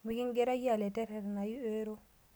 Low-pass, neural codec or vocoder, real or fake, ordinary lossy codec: none; none; real; none